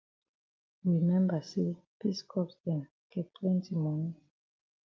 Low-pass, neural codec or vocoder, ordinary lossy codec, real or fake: 7.2 kHz; none; Opus, 24 kbps; real